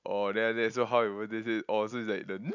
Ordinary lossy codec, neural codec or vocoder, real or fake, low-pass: none; none; real; 7.2 kHz